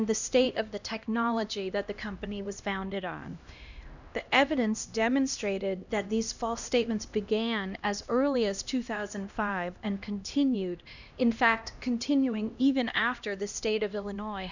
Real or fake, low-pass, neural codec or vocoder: fake; 7.2 kHz; codec, 16 kHz, 1 kbps, X-Codec, HuBERT features, trained on LibriSpeech